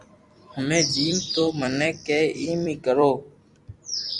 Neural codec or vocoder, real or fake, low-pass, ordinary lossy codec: vocoder, 44.1 kHz, 128 mel bands every 256 samples, BigVGAN v2; fake; 10.8 kHz; Opus, 64 kbps